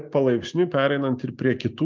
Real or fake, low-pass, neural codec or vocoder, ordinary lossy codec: real; 7.2 kHz; none; Opus, 24 kbps